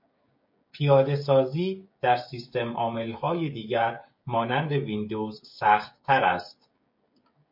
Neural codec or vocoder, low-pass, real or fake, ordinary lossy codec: codec, 16 kHz, 16 kbps, FreqCodec, smaller model; 5.4 kHz; fake; MP3, 32 kbps